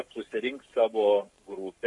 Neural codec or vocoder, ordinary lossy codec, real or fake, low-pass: codec, 44.1 kHz, 7.8 kbps, Pupu-Codec; MP3, 48 kbps; fake; 10.8 kHz